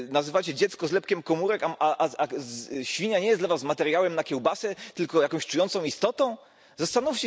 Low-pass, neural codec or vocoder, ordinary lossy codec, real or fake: none; none; none; real